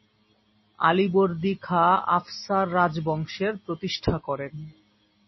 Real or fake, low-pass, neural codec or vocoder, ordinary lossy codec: real; 7.2 kHz; none; MP3, 24 kbps